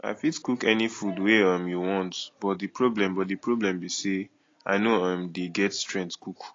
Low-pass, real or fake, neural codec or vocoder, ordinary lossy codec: 7.2 kHz; real; none; AAC, 32 kbps